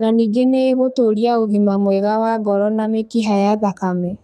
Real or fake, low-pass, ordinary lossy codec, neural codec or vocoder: fake; 14.4 kHz; none; codec, 32 kHz, 1.9 kbps, SNAC